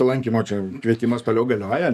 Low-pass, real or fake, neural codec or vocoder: 14.4 kHz; fake; codec, 44.1 kHz, 7.8 kbps, DAC